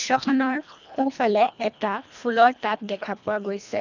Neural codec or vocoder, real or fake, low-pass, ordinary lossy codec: codec, 24 kHz, 1.5 kbps, HILCodec; fake; 7.2 kHz; none